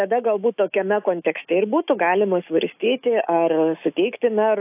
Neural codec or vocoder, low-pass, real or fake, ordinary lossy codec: autoencoder, 48 kHz, 128 numbers a frame, DAC-VAE, trained on Japanese speech; 3.6 kHz; fake; AAC, 32 kbps